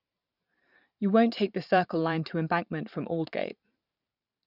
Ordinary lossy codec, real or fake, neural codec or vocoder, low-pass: none; fake; vocoder, 44.1 kHz, 128 mel bands every 512 samples, BigVGAN v2; 5.4 kHz